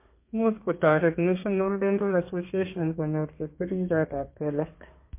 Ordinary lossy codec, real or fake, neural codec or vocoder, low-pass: MP3, 24 kbps; fake; codec, 32 kHz, 1.9 kbps, SNAC; 3.6 kHz